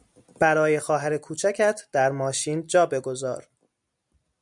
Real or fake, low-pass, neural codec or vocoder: real; 10.8 kHz; none